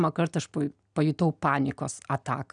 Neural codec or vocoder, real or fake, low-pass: none; real; 9.9 kHz